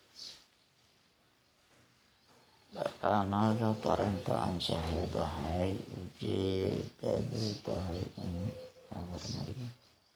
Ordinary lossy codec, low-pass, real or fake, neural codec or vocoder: none; none; fake; codec, 44.1 kHz, 3.4 kbps, Pupu-Codec